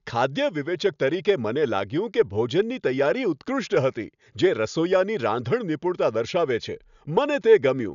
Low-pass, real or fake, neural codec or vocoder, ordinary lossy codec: 7.2 kHz; real; none; none